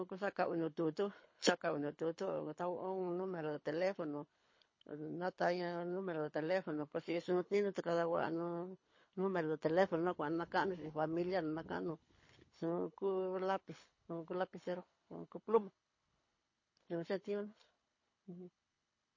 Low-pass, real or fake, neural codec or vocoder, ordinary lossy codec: 7.2 kHz; fake; codec, 24 kHz, 6 kbps, HILCodec; MP3, 32 kbps